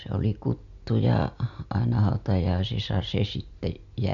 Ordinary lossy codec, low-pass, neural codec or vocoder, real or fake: none; 7.2 kHz; none; real